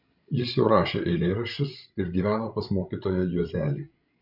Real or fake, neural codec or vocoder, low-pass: fake; codec, 16 kHz, 16 kbps, FreqCodec, larger model; 5.4 kHz